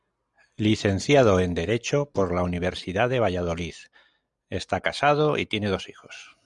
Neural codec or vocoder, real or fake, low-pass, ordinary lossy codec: none; real; 10.8 kHz; MP3, 96 kbps